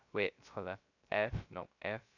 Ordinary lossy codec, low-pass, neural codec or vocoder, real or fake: none; 7.2 kHz; codec, 16 kHz, 0.3 kbps, FocalCodec; fake